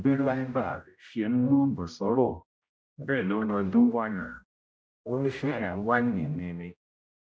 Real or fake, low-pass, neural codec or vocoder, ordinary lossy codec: fake; none; codec, 16 kHz, 0.5 kbps, X-Codec, HuBERT features, trained on general audio; none